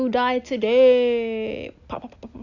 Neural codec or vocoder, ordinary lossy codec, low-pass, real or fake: none; none; 7.2 kHz; real